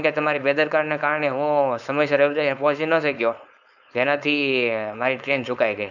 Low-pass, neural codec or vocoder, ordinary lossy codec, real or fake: 7.2 kHz; codec, 16 kHz, 4.8 kbps, FACodec; none; fake